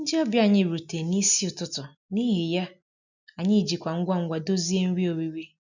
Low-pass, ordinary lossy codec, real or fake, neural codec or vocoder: 7.2 kHz; none; real; none